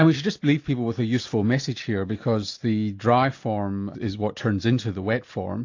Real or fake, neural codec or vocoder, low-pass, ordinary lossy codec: real; none; 7.2 kHz; AAC, 48 kbps